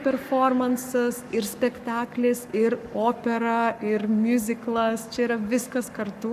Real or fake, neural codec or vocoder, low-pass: fake; codec, 44.1 kHz, 7.8 kbps, Pupu-Codec; 14.4 kHz